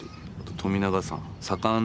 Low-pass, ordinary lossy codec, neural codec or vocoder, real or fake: none; none; none; real